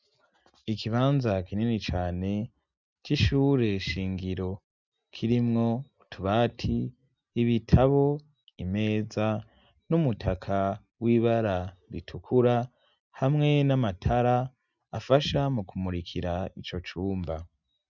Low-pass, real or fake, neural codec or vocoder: 7.2 kHz; real; none